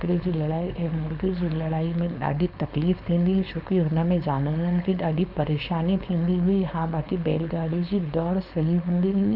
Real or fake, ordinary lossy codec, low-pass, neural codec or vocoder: fake; none; 5.4 kHz; codec, 16 kHz, 4.8 kbps, FACodec